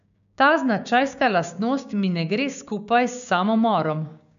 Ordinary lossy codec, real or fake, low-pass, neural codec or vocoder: none; fake; 7.2 kHz; codec, 16 kHz, 6 kbps, DAC